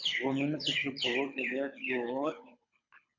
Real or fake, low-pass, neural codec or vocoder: fake; 7.2 kHz; codec, 24 kHz, 6 kbps, HILCodec